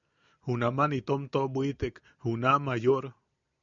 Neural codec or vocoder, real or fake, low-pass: none; real; 7.2 kHz